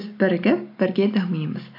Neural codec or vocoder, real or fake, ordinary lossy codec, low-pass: none; real; AAC, 32 kbps; 5.4 kHz